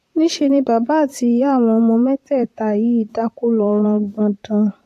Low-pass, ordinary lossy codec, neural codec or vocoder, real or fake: 14.4 kHz; AAC, 64 kbps; vocoder, 44.1 kHz, 128 mel bands, Pupu-Vocoder; fake